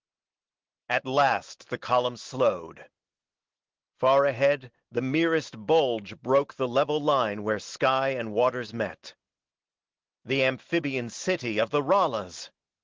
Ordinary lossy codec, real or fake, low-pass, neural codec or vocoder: Opus, 16 kbps; real; 7.2 kHz; none